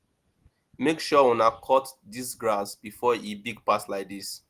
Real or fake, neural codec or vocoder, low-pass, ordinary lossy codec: real; none; 14.4 kHz; Opus, 24 kbps